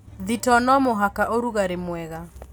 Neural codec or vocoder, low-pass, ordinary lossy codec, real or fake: none; none; none; real